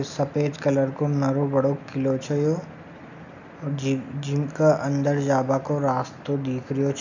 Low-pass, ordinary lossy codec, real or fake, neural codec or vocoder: 7.2 kHz; none; real; none